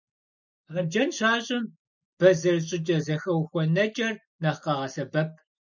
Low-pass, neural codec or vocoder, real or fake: 7.2 kHz; none; real